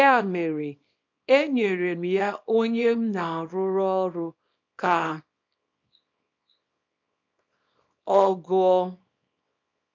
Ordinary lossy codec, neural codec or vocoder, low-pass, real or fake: MP3, 64 kbps; codec, 24 kHz, 0.9 kbps, WavTokenizer, small release; 7.2 kHz; fake